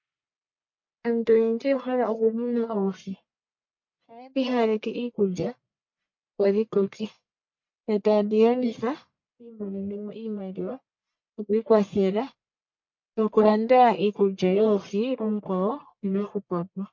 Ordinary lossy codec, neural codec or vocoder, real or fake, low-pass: MP3, 48 kbps; codec, 44.1 kHz, 1.7 kbps, Pupu-Codec; fake; 7.2 kHz